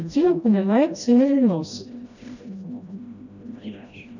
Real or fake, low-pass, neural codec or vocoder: fake; 7.2 kHz; codec, 16 kHz, 1 kbps, FreqCodec, smaller model